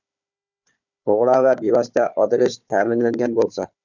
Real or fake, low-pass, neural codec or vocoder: fake; 7.2 kHz; codec, 16 kHz, 4 kbps, FunCodec, trained on Chinese and English, 50 frames a second